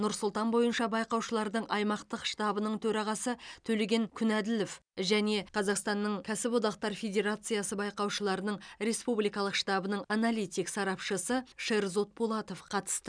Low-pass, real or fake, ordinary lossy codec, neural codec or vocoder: 9.9 kHz; real; none; none